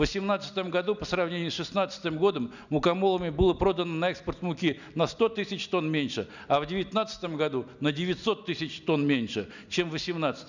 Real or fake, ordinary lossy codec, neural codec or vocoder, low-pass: real; none; none; 7.2 kHz